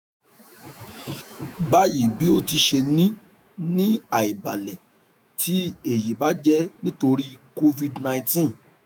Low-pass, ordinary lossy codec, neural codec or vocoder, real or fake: none; none; autoencoder, 48 kHz, 128 numbers a frame, DAC-VAE, trained on Japanese speech; fake